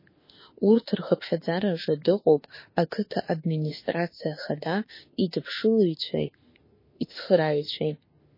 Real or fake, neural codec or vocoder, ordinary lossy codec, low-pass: fake; autoencoder, 48 kHz, 32 numbers a frame, DAC-VAE, trained on Japanese speech; MP3, 24 kbps; 5.4 kHz